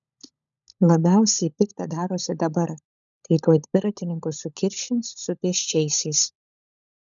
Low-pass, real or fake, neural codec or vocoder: 7.2 kHz; fake; codec, 16 kHz, 16 kbps, FunCodec, trained on LibriTTS, 50 frames a second